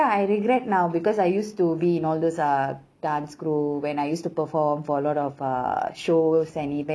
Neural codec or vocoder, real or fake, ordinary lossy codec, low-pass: none; real; none; none